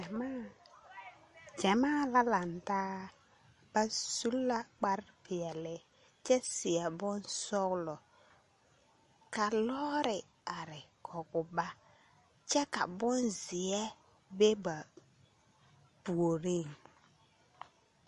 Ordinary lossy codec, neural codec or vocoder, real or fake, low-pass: MP3, 48 kbps; none; real; 14.4 kHz